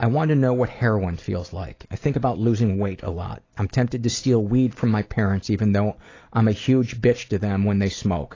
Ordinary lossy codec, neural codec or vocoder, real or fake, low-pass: AAC, 32 kbps; none; real; 7.2 kHz